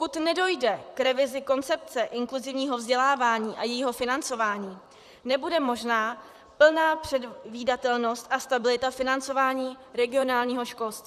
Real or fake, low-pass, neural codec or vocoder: fake; 14.4 kHz; vocoder, 44.1 kHz, 128 mel bands, Pupu-Vocoder